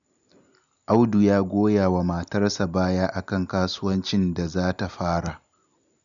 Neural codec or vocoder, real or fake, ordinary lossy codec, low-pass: none; real; none; 7.2 kHz